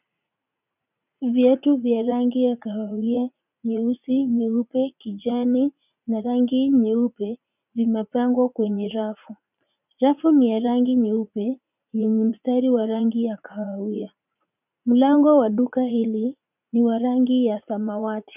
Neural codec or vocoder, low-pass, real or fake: vocoder, 44.1 kHz, 80 mel bands, Vocos; 3.6 kHz; fake